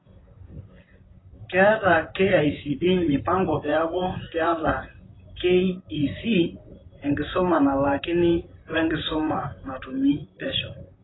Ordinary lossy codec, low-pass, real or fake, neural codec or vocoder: AAC, 16 kbps; 7.2 kHz; fake; vocoder, 44.1 kHz, 128 mel bands, Pupu-Vocoder